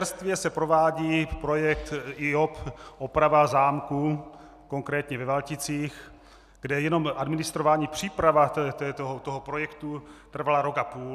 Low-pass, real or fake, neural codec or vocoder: 14.4 kHz; real; none